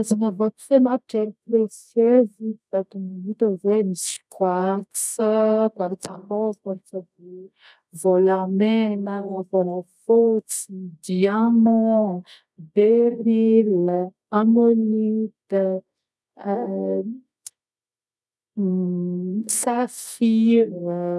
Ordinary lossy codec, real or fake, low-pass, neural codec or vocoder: none; fake; none; codec, 24 kHz, 0.9 kbps, WavTokenizer, medium music audio release